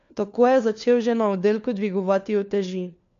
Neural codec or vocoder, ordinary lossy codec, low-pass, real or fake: codec, 16 kHz, 4 kbps, FunCodec, trained on LibriTTS, 50 frames a second; MP3, 48 kbps; 7.2 kHz; fake